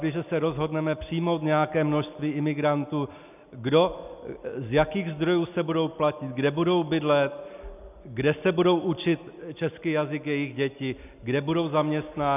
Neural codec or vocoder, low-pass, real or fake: none; 3.6 kHz; real